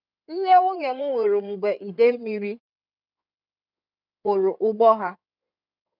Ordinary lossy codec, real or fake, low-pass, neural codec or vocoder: none; fake; 5.4 kHz; codec, 16 kHz in and 24 kHz out, 2.2 kbps, FireRedTTS-2 codec